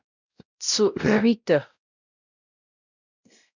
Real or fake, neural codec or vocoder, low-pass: fake; codec, 16 kHz, 0.5 kbps, X-Codec, WavLM features, trained on Multilingual LibriSpeech; 7.2 kHz